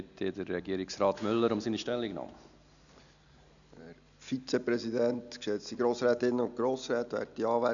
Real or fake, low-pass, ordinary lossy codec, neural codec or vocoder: real; 7.2 kHz; none; none